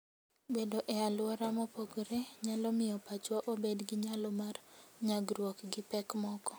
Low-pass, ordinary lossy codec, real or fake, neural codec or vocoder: none; none; real; none